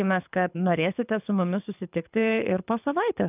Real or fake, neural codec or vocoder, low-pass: fake; codec, 24 kHz, 6 kbps, HILCodec; 3.6 kHz